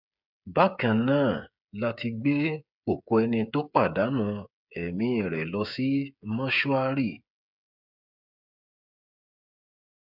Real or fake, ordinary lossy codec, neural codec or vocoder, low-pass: fake; none; codec, 16 kHz, 8 kbps, FreqCodec, smaller model; 5.4 kHz